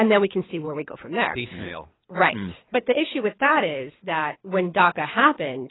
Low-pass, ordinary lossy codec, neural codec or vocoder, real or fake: 7.2 kHz; AAC, 16 kbps; codec, 24 kHz, 3 kbps, HILCodec; fake